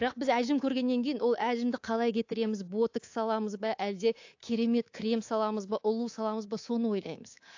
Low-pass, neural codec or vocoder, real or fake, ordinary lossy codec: 7.2 kHz; codec, 24 kHz, 3.1 kbps, DualCodec; fake; AAC, 48 kbps